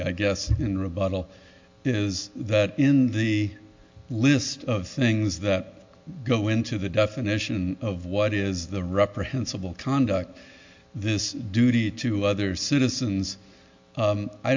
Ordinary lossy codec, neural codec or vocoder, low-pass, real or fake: MP3, 48 kbps; none; 7.2 kHz; real